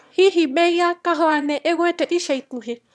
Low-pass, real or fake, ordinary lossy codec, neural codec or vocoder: none; fake; none; autoencoder, 22.05 kHz, a latent of 192 numbers a frame, VITS, trained on one speaker